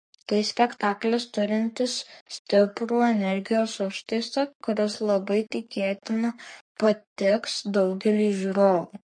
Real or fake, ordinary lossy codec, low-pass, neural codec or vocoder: fake; MP3, 48 kbps; 9.9 kHz; codec, 44.1 kHz, 3.4 kbps, Pupu-Codec